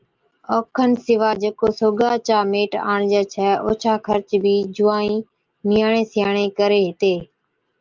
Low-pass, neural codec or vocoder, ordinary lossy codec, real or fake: 7.2 kHz; none; Opus, 32 kbps; real